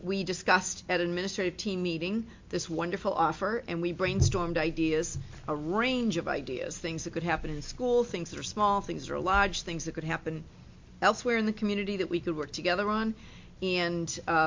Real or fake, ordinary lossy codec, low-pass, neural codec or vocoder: real; MP3, 48 kbps; 7.2 kHz; none